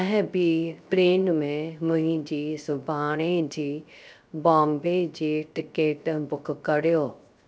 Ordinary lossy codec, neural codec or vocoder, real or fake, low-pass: none; codec, 16 kHz, 0.3 kbps, FocalCodec; fake; none